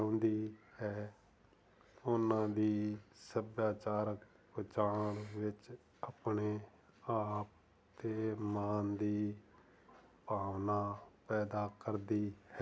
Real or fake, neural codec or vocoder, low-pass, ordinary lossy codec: real; none; none; none